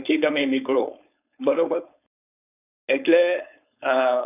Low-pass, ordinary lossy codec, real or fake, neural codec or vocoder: 3.6 kHz; none; fake; codec, 16 kHz, 4.8 kbps, FACodec